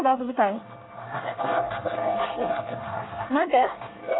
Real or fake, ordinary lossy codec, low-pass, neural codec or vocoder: fake; AAC, 16 kbps; 7.2 kHz; codec, 24 kHz, 1 kbps, SNAC